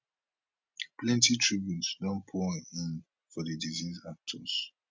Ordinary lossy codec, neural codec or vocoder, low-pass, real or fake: none; none; none; real